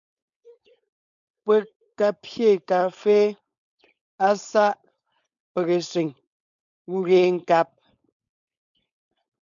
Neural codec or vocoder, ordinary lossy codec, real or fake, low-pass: codec, 16 kHz, 4.8 kbps, FACodec; MP3, 96 kbps; fake; 7.2 kHz